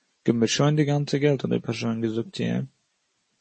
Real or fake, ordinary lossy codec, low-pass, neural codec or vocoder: real; MP3, 32 kbps; 10.8 kHz; none